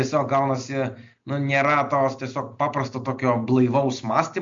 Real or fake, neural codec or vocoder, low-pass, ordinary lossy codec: real; none; 7.2 kHz; MP3, 48 kbps